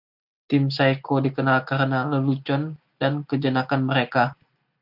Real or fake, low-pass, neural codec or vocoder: real; 5.4 kHz; none